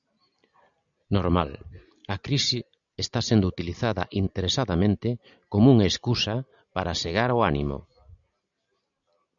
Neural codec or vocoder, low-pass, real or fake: none; 7.2 kHz; real